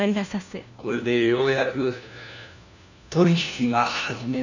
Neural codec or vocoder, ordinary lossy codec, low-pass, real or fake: codec, 16 kHz, 1 kbps, FunCodec, trained on LibriTTS, 50 frames a second; none; 7.2 kHz; fake